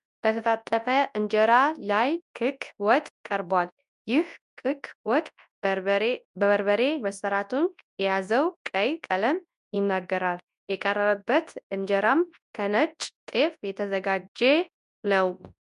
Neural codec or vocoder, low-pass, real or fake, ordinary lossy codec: codec, 24 kHz, 0.9 kbps, WavTokenizer, large speech release; 10.8 kHz; fake; MP3, 96 kbps